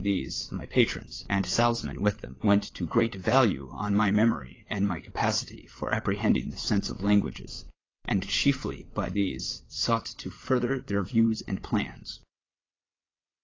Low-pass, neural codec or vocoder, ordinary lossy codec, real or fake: 7.2 kHz; vocoder, 22.05 kHz, 80 mel bands, WaveNeXt; AAC, 32 kbps; fake